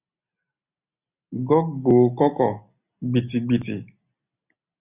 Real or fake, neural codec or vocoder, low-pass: real; none; 3.6 kHz